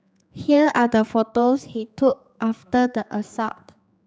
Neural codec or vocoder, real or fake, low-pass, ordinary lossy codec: codec, 16 kHz, 4 kbps, X-Codec, HuBERT features, trained on general audio; fake; none; none